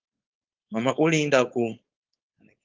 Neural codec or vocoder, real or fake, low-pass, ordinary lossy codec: codec, 16 kHz, 4.8 kbps, FACodec; fake; 7.2 kHz; Opus, 32 kbps